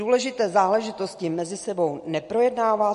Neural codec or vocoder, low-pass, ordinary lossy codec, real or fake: none; 10.8 kHz; MP3, 48 kbps; real